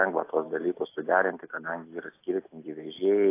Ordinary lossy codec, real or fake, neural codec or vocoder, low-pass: AAC, 24 kbps; real; none; 3.6 kHz